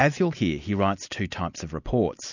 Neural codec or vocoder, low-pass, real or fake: none; 7.2 kHz; real